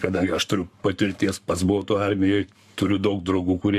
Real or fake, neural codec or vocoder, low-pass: fake; codec, 44.1 kHz, 7.8 kbps, Pupu-Codec; 14.4 kHz